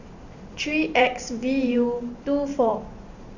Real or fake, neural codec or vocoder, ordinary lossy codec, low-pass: fake; vocoder, 44.1 kHz, 128 mel bands every 512 samples, BigVGAN v2; none; 7.2 kHz